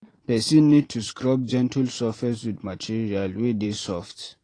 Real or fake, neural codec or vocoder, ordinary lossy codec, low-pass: fake; vocoder, 44.1 kHz, 128 mel bands every 512 samples, BigVGAN v2; AAC, 32 kbps; 9.9 kHz